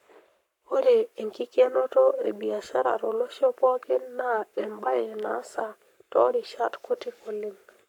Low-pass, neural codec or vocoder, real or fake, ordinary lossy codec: 19.8 kHz; codec, 44.1 kHz, 7.8 kbps, Pupu-Codec; fake; none